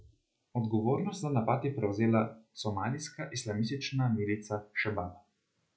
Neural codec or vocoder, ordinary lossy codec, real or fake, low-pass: none; none; real; none